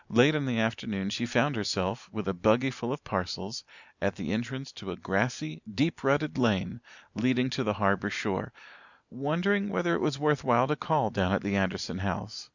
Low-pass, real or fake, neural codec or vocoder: 7.2 kHz; real; none